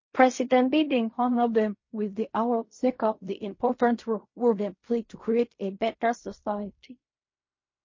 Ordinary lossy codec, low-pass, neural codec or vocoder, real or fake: MP3, 32 kbps; 7.2 kHz; codec, 16 kHz in and 24 kHz out, 0.4 kbps, LongCat-Audio-Codec, fine tuned four codebook decoder; fake